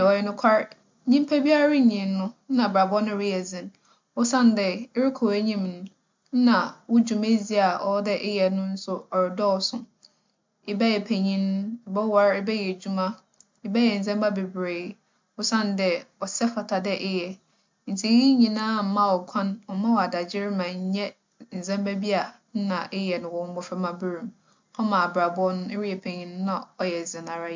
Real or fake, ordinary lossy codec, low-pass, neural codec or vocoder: real; MP3, 64 kbps; 7.2 kHz; none